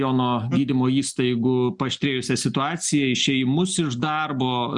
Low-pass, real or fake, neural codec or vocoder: 10.8 kHz; real; none